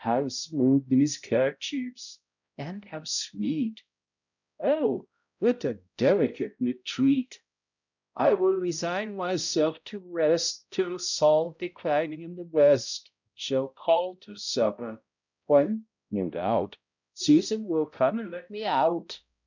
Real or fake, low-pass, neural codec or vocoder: fake; 7.2 kHz; codec, 16 kHz, 0.5 kbps, X-Codec, HuBERT features, trained on balanced general audio